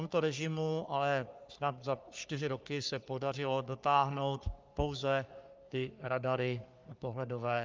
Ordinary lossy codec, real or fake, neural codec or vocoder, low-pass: Opus, 24 kbps; fake; codec, 44.1 kHz, 3.4 kbps, Pupu-Codec; 7.2 kHz